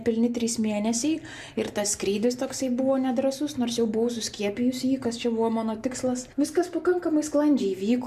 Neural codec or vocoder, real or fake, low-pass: none; real; 14.4 kHz